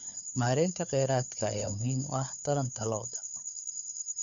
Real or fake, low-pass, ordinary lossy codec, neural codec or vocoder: fake; 7.2 kHz; none; codec, 16 kHz, 4 kbps, FunCodec, trained on LibriTTS, 50 frames a second